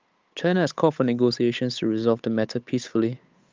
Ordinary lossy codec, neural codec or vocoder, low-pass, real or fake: Opus, 24 kbps; codec, 16 kHz, 8 kbps, FunCodec, trained on Chinese and English, 25 frames a second; 7.2 kHz; fake